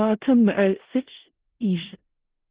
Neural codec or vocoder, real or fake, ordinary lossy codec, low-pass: codec, 16 kHz in and 24 kHz out, 0.4 kbps, LongCat-Audio-Codec, four codebook decoder; fake; Opus, 16 kbps; 3.6 kHz